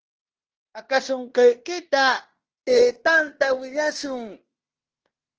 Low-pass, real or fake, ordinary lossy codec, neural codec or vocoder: 7.2 kHz; fake; Opus, 16 kbps; codec, 16 kHz in and 24 kHz out, 0.9 kbps, LongCat-Audio-Codec, fine tuned four codebook decoder